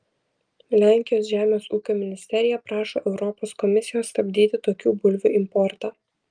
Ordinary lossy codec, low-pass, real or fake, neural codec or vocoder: Opus, 32 kbps; 9.9 kHz; real; none